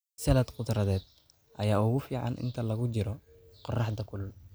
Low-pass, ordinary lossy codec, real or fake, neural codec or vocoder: none; none; real; none